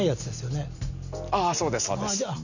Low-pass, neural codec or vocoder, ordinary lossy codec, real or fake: 7.2 kHz; none; none; real